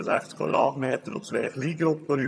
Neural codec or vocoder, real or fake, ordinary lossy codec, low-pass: vocoder, 22.05 kHz, 80 mel bands, HiFi-GAN; fake; none; none